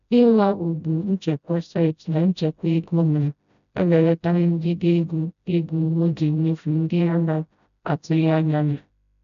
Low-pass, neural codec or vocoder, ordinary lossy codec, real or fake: 7.2 kHz; codec, 16 kHz, 0.5 kbps, FreqCodec, smaller model; none; fake